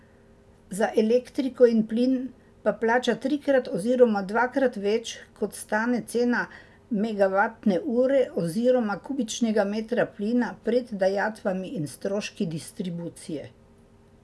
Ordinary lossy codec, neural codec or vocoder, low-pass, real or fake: none; none; none; real